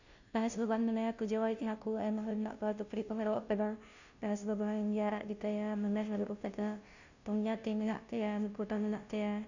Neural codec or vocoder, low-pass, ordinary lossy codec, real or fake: codec, 16 kHz, 0.5 kbps, FunCodec, trained on Chinese and English, 25 frames a second; 7.2 kHz; none; fake